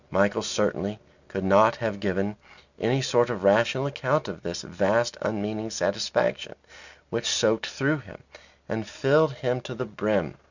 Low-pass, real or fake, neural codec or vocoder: 7.2 kHz; real; none